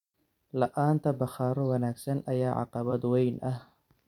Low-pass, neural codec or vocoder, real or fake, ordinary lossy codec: 19.8 kHz; vocoder, 44.1 kHz, 128 mel bands every 256 samples, BigVGAN v2; fake; none